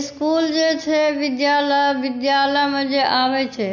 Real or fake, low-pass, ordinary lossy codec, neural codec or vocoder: real; 7.2 kHz; none; none